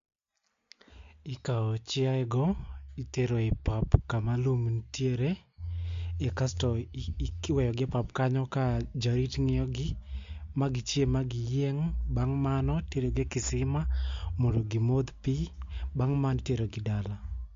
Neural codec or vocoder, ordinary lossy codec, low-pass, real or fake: none; MP3, 48 kbps; 7.2 kHz; real